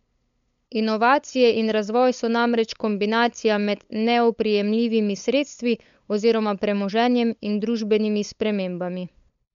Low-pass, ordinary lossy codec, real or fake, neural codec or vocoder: 7.2 kHz; MP3, 64 kbps; fake; codec, 16 kHz, 8 kbps, FunCodec, trained on LibriTTS, 25 frames a second